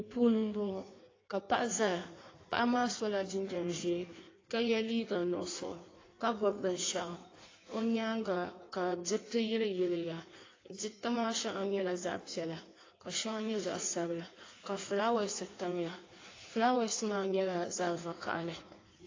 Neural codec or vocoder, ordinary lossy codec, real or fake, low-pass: codec, 16 kHz in and 24 kHz out, 1.1 kbps, FireRedTTS-2 codec; AAC, 32 kbps; fake; 7.2 kHz